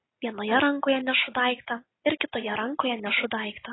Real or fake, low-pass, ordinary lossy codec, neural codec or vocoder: real; 7.2 kHz; AAC, 16 kbps; none